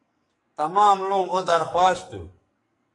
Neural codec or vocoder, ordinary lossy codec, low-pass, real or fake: codec, 44.1 kHz, 2.6 kbps, SNAC; AAC, 48 kbps; 10.8 kHz; fake